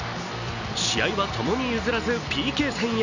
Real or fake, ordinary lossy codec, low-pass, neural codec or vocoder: real; none; 7.2 kHz; none